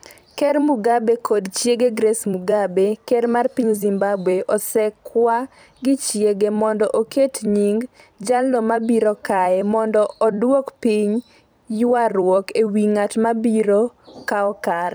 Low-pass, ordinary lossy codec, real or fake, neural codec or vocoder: none; none; fake; vocoder, 44.1 kHz, 128 mel bands every 256 samples, BigVGAN v2